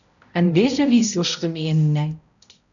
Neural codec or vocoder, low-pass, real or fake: codec, 16 kHz, 0.5 kbps, X-Codec, HuBERT features, trained on balanced general audio; 7.2 kHz; fake